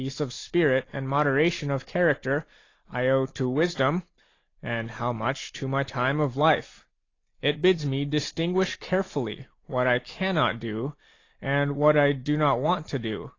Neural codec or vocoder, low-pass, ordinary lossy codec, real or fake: none; 7.2 kHz; AAC, 32 kbps; real